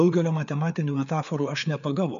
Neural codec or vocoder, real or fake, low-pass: codec, 16 kHz, 4 kbps, FreqCodec, larger model; fake; 7.2 kHz